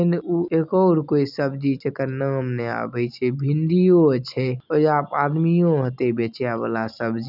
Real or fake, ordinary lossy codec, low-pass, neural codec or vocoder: real; none; 5.4 kHz; none